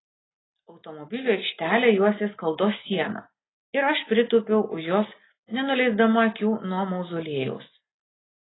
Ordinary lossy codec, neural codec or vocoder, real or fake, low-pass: AAC, 16 kbps; none; real; 7.2 kHz